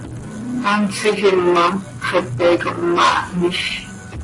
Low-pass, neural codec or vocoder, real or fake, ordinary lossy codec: 10.8 kHz; none; real; AAC, 32 kbps